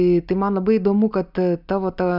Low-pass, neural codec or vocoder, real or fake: 5.4 kHz; none; real